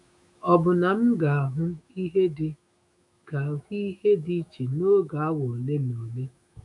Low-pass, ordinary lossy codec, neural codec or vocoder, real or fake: 10.8 kHz; none; autoencoder, 48 kHz, 128 numbers a frame, DAC-VAE, trained on Japanese speech; fake